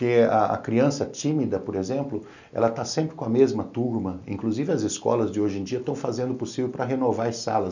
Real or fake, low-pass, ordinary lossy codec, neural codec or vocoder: real; 7.2 kHz; none; none